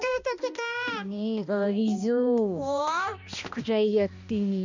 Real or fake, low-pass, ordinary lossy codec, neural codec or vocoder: fake; 7.2 kHz; none; codec, 16 kHz, 1 kbps, X-Codec, HuBERT features, trained on balanced general audio